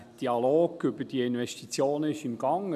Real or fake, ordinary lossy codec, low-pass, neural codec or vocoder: real; none; 14.4 kHz; none